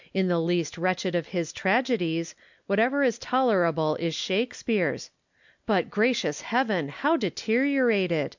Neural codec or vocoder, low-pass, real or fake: none; 7.2 kHz; real